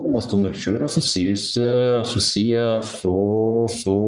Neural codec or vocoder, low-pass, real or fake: codec, 44.1 kHz, 1.7 kbps, Pupu-Codec; 10.8 kHz; fake